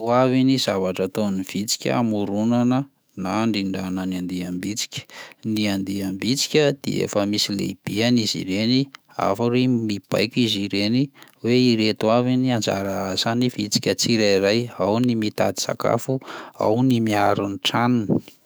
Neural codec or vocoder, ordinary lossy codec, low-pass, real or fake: autoencoder, 48 kHz, 128 numbers a frame, DAC-VAE, trained on Japanese speech; none; none; fake